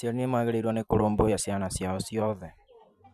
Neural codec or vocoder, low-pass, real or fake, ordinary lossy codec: vocoder, 44.1 kHz, 128 mel bands every 512 samples, BigVGAN v2; 14.4 kHz; fake; none